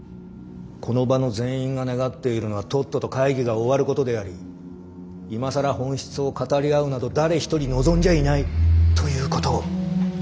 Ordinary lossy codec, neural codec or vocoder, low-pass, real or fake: none; none; none; real